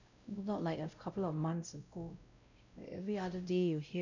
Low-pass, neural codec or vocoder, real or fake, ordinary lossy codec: 7.2 kHz; codec, 16 kHz, 0.5 kbps, X-Codec, WavLM features, trained on Multilingual LibriSpeech; fake; none